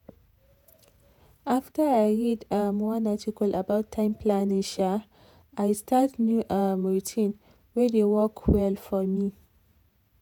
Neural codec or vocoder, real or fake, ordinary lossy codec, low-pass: vocoder, 48 kHz, 128 mel bands, Vocos; fake; none; 19.8 kHz